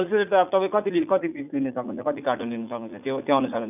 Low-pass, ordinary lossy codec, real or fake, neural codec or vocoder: 3.6 kHz; none; fake; vocoder, 44.1 kHz, 80 mel bands, Vocos